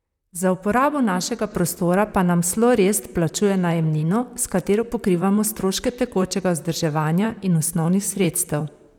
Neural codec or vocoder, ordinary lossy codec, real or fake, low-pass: vocoder, 44.1 kHz, 128 mel bands, Pupu-Vocoder; none; fake; 19.8 kHz